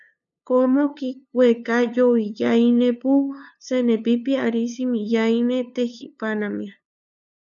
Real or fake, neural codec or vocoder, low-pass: fake; codec, 16 kHz, 2 kbps, FunCodec, trained on LibriTTS, 25 frames a second; 7.2 kHz